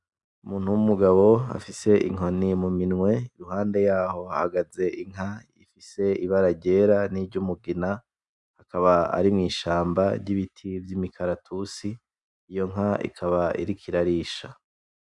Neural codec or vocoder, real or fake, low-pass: none; real; 10.8 kHz